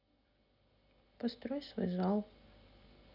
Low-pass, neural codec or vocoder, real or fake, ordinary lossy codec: 5.4 kHz; none; real; none